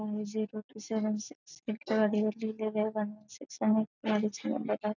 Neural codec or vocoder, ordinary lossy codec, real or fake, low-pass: none; none; real; 7.2 kHz